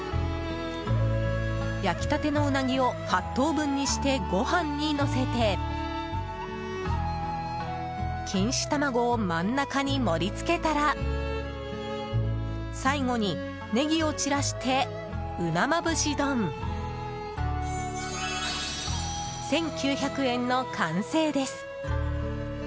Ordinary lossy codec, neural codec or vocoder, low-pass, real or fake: none; none; none; real